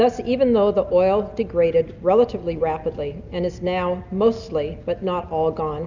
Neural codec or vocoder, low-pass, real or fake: none; 7.2 kHz; real